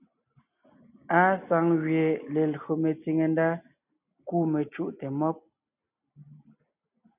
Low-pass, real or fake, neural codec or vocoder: 3.6 kHz; real; none